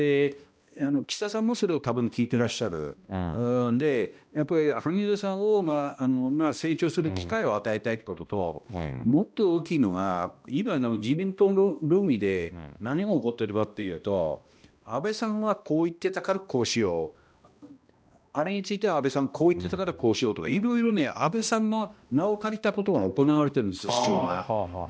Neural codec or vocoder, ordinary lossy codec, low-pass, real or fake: codec, 16 kHz, 1 kbps, X-Codec, HuBERT features, trained on balanced general audio; none; none; fake